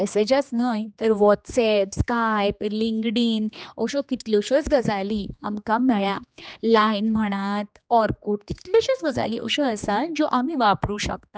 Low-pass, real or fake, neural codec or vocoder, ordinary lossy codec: none; fake; codec, 16 kHz, 2 kbps, X-Codec, HuBERT features, trained on general audio; none